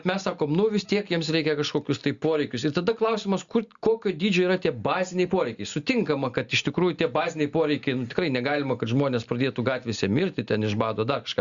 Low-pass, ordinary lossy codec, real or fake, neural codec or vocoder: 7.2 kHz; Opus, 64 kbps; real; none